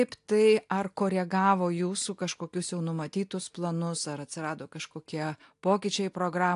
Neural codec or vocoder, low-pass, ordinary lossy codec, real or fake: none; 10.8 kHz; AAC, 64 kbps; real